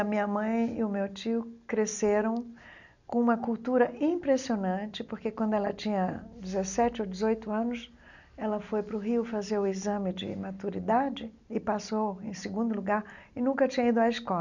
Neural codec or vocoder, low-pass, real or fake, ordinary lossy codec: none; 7.2 kHz; real; none